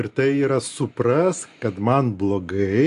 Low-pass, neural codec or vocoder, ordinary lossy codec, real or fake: 10.8 kHz; none; AAC, 64 kbps; real